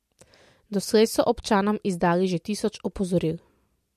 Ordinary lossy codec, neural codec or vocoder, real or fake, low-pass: MP3, 64 kbps; none; real; 14.4 kHz